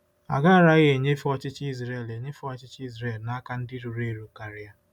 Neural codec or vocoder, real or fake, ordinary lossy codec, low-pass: none; real; none; 19.8 kHz